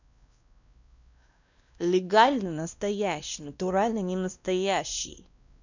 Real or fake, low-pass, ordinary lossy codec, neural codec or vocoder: fake; 7.2 kHz; none; codec, 16 kHz, 1 kbps, X-Codec, WavLM features, trained on Multilingual LibriSpeech